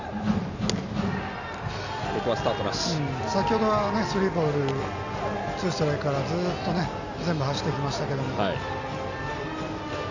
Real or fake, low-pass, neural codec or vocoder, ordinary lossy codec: real; 7.2 kHz; none; none